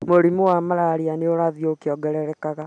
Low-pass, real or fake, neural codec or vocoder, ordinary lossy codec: 9.9 kHz; real; none; none